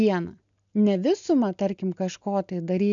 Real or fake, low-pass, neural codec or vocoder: real; 7.2 kHz; none